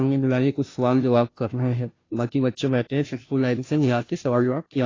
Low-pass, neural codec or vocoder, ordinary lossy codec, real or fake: 7.2 kHz; codec, 16 kHz, 0.5 kbps, FunCodec, trained on Chinese and English, 25 frames a second; AAC, 32 kbps; fake